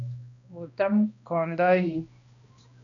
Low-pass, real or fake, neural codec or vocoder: 7.2 kHz; fake; codec, 16 kHz, 1 kbps, X-Codec, HuBERT features, trained on balanced general audio